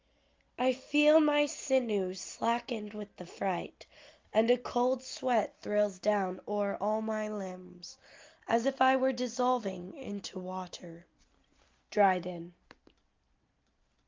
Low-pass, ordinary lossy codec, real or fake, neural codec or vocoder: 7.2 kHz; Opus, 32 kbps; real; none